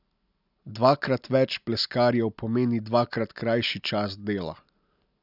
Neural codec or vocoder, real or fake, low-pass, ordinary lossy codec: none; real; 5.4 kHz; none